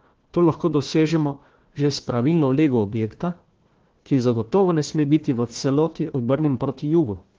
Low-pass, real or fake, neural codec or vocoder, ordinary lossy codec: 7.2 kHz; fake; codec, 16 kHz, 1 kbps, FunCodec, trained on Chinese and English, 50 frames a second; Opus, 16 kbps